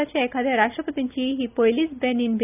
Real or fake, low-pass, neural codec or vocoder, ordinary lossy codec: real; 3.6 kHz; none; none